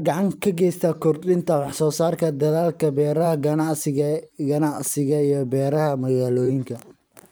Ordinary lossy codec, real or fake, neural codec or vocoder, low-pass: none; fake; vocoder, 44.1 kHz, 128 mel bands every 512 samples, BigVGAN v2; none